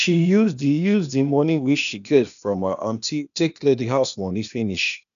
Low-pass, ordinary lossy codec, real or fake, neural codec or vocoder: 7.2 kHz; none; fake; codec, 16 kHz, 0.8 kbps, ZipCodec